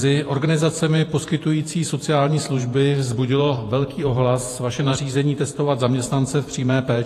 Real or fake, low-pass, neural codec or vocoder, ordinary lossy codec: fake; 14.4 kHz; vocoder, 48 kHz, 128 mel bands, Vocos; AAC, 48 kbps